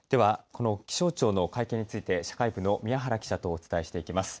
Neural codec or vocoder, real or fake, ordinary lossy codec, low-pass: none; real; none; none